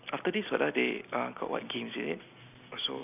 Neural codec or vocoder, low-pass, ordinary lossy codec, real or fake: none; 3.6 kHz; none; real